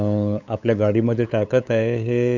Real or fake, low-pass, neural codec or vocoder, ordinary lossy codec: fake; 7.2 kHz; codec, 16 kHz, 8 kbps, FunCodec, trained on LibriTTS, 25 frames a second; none